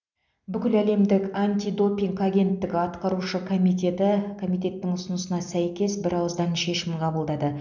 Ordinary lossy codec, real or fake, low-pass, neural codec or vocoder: none; real; 7.2 kHz; none